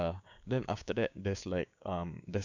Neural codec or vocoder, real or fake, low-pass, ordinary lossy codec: codec, 16 kHz, 6 kbps, DAC; fake; 7.2 kHz; none